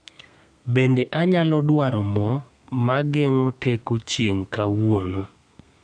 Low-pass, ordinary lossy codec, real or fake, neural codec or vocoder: 9.9 kHz; none; fake; codec, 32 kHz, 1.9 kbps, SNAC